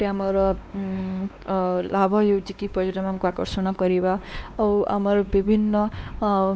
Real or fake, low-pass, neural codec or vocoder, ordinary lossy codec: fake; none; codec, 16 kHz, 2 kbps, X-Codec, WavLM features, trained on Multilingual LibriSpeech; none